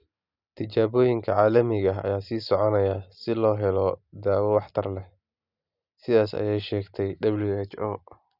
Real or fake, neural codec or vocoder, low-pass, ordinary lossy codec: real; none; 5.4 kHz; none